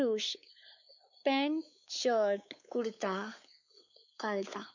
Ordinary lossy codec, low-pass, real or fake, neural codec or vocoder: none; 7.2 kHz; fake; codec, 16 kHz, 4 kbps, X-Codec, WavLM features, trained on Multilingual LibriSpeech